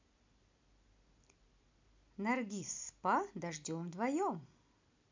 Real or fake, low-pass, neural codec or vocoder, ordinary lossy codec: real; 7.2 kHz; none; MP3, 64 kbps